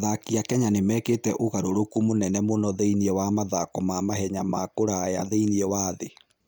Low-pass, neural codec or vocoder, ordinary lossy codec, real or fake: none; none; none; real